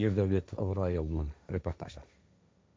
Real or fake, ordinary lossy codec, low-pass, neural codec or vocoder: fake; none; none; codec, 16 kHz, 1.1 kbps, Voila-Tokenizer